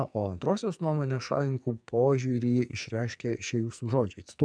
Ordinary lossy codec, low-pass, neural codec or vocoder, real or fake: MP3, 96 kbps; 9.9 kHz; codec, 44.1 kHz, 2.6 kbps, SNAC; fake